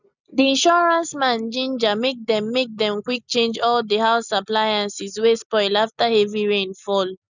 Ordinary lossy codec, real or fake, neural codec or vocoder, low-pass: none; real; none; 7.2 kHz